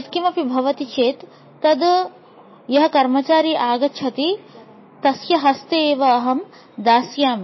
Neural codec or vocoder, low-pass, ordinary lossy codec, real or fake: none; 7.2 kHz; MP3, 24 kbps; real